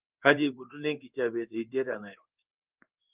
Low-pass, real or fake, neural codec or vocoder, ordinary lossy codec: 3.6 kHz; fake; codec, 16 kHz in and 24 kHz out, 1 kbps, XY-Tokenizer; Opus, 24 kbps